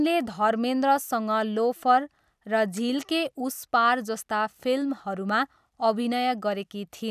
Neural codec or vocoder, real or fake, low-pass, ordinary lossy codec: none; real; 14.4 kHz; none